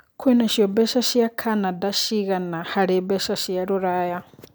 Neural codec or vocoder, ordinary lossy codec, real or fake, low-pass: none; none; real; none